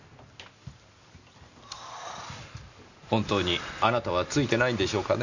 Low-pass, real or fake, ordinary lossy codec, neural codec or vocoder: 7.2 kHz; real; none; none